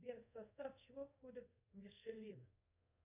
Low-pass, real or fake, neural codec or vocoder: 3.6 kHz; fake; codec, 24 kHz, 0.5 kbps, DualCodec